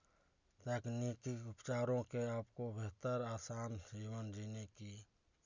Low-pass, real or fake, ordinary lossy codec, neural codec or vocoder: 7.2 kHz; real; none; none